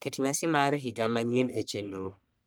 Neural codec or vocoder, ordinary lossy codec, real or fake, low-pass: codec, 44.1 kHz, 1.7 kbps, Pupu-Codec; none; fake; none